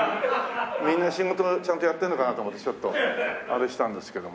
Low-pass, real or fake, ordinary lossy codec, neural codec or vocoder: none; real; none; none